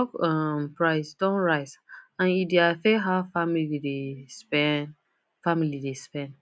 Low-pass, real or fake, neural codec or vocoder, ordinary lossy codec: none; real; none; none